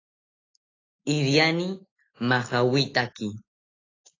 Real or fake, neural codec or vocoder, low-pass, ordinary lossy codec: real; none; 7.2 kHz; AAC, 32 kbps